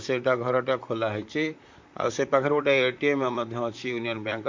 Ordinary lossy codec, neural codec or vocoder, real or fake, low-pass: MP3, 64 kbps; vocoder, 44.1 kHz, 128 mel bands, Pupu-Vocoder; fake; 7.2 kHz